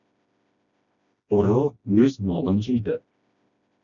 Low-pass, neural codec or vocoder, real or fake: 7.2 kHz; codec, 16 kHz, 1 kbps, FreqCodec, smaller model; fake